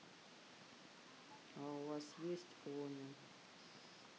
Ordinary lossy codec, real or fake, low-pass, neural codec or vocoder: none; real; none; none